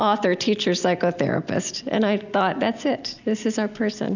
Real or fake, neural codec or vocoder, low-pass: real; none; 7.2 kHz